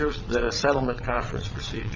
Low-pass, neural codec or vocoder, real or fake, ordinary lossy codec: 7.2 kHz; vocoder, 22.05 kHz, 80 mel bands, WaveNeXt; fake; MP3, 64 kbps